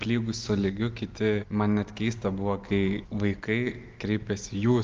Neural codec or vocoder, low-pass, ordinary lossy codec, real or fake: none; 7.2 kHz; Opus, 32 kbps; real